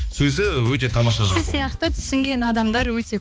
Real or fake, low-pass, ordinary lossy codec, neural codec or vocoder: fake; none; none; codec, 16 kHz, 2 kbps, X-Codec, HuBERT features, trained on balanced general audio